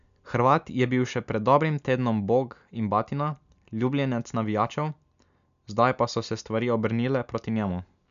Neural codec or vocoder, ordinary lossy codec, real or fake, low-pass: none; none; real; 7.2 kHz